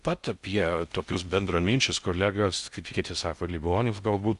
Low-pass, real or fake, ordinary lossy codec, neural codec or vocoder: 10.8 kHz; fake; Opus, 64 kbps; codec, 16 kHz in and 24 kHz out, 0.6 kbps, FocalCodec, streaming, 4096 codes